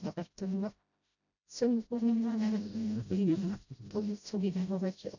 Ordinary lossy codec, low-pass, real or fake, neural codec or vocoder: none; 7.2 kHz; fake; codec, 16 kHz, 0.5 kbps, FreqCodec, smaller model